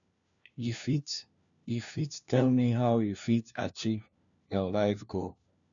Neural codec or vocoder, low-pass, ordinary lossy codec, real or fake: codec, 16 kHz, 1 kbps, FunCodec, trained on LibriTTS, 50 frames a second; 7.2 kHz; none; fake